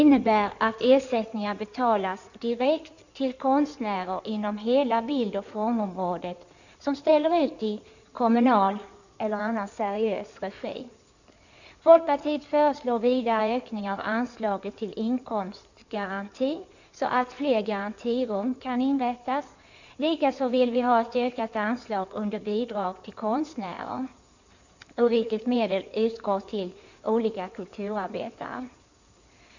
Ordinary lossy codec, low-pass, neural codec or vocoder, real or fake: none; 7.2 kHz; codec, 16 kHz in and 24 kHz out, 2.2 kbps, FireRedTTS-2 codec; fake